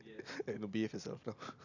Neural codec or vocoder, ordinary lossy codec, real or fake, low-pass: none; none; real; 7.2 kHz